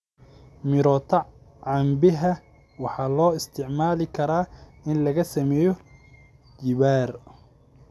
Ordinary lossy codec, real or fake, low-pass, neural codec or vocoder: none; real; none; none